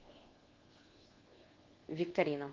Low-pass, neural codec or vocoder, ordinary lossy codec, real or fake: 7.2 kHz; codec, 24 kHz, 1.2 kbps, DualCodec; Opus, 16 kbps; fake